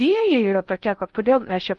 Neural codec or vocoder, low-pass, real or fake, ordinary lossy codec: codec, 16 kHz in and 24 kHz out, 0.6 kbps, FocalCodec, streaming, 4096 codes; 10.8 kHz; fake; Opus, 32 kbps